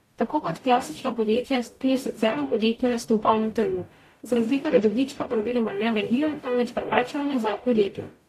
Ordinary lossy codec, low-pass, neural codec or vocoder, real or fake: AAC, 64 kbps; 14.4 kHz; codec, 44.1 kHz, 0.9 kbps, DAC; fake